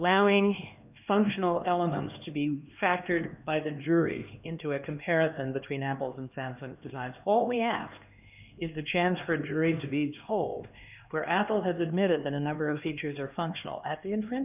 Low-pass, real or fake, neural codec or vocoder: 3.6 kHz; fake; codec, 16 kHz, 2 kbps, X-Codec, HuBERT features, trained on LibriSpeech